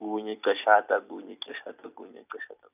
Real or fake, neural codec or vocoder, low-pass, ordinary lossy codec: fake; vocoder, 44.1 kHz, 128 mel bands every 256 samples, BigVGAN v2; 3.6 kHz; none